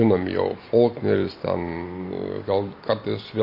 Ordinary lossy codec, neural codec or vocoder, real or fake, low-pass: MP3, 32 kbps; none; real; 5.4 kHz